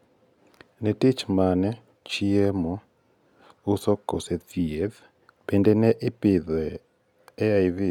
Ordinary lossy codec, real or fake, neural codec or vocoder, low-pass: none; real; none; 19.8 kHz